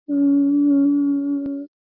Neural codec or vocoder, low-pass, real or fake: none; 5.4 kHz; real